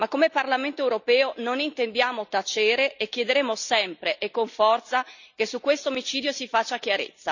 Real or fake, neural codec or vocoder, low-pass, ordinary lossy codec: real; none; 7.2 kHz; none